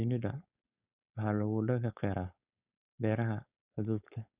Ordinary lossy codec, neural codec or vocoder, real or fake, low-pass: none; codec, 16 kHz, 4.8 kbps, FACodec; fake; 3.6 kHz